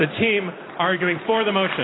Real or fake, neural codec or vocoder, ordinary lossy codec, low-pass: real; none; AAC, 16 kbps; 7.2 kHz